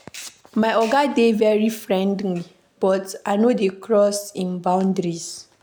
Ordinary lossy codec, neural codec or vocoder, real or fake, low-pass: none; none; real; none